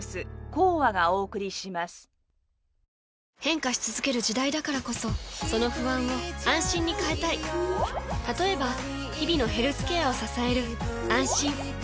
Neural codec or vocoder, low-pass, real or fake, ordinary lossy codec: none; none; real; none